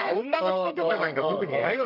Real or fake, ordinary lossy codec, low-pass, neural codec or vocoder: fake; none; 5.4 kHz; codec, 32 kHz, 1.9 kbps, SNAC